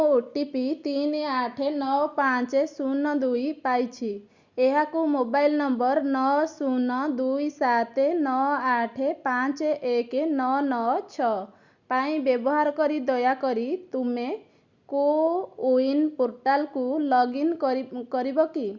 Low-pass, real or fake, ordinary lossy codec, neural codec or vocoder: 7.2 kHz; real; Opus, 64 kbps; none